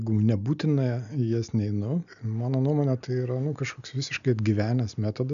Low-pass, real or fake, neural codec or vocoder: 7.2 kHz; real; none